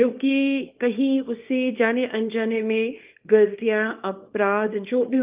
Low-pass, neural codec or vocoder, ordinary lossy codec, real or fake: 3.6 kHz; codec, 24 kHz, 0.9 kbps, WavTokenizer, small release; Opus, 24 kbps; fake